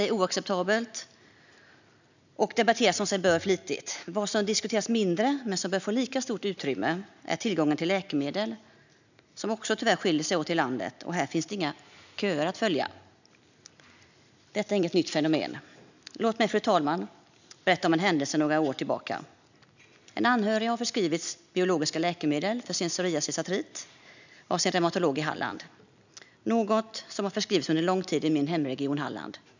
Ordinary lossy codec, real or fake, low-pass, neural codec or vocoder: none; real; 7.2 kHz; none